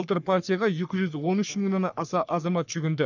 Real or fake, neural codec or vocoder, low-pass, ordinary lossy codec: fake; codec, 44.1 kHz, 3.4 kbps, Pupu-Codec; 7.2 kHz; none